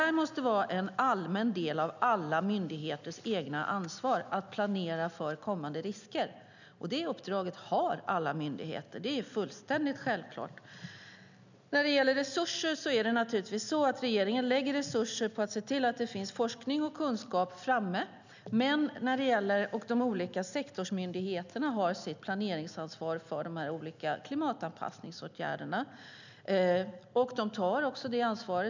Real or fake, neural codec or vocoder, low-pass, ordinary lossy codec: real; none; 7.2 kHz; none